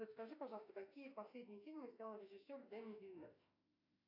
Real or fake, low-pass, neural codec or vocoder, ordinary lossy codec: fake; 5.4 kHz; autoencoder, 48 kHz, 32 numbers a frame, DAC-VAE, trained on Japanese speech; AAC, 48 kbps